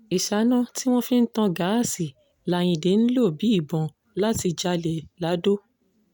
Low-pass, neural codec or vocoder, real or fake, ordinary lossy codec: none; none; real; none